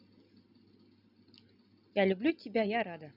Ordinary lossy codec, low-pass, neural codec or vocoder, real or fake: none; 5.4 kHz; none; real